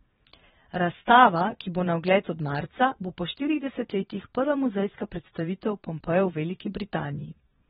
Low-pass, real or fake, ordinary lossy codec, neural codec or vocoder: 9.9 kHz; fake; AAC, 16 kbps; vocoder, 22.05 kHz, 80 mel bands, Vocos